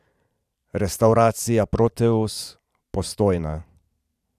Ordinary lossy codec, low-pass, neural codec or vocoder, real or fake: none; 14.4 kHz; vocoder, 44.1 kHz, 128 mel bands every 512 samples, BigVGAN v2; fake